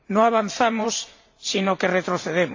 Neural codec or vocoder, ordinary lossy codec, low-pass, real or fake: vocoder, 44.1 kHz, 128 mel bands every 512 samples, BigVGAN v2; AAC, 48 kbps; 7.2 kHz; fake